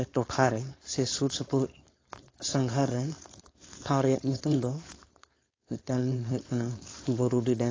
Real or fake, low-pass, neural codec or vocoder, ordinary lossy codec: fake; 7.2 kHz; codec, 16 kHz, 4.8 kbps, FACodec; AAC, 32 kbps